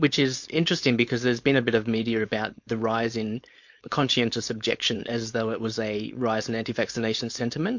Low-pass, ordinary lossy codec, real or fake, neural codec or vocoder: 7.2 kHz; MP3, 48 kbps; fake; codec, 16 kHz, 4.8 kbps, FACodec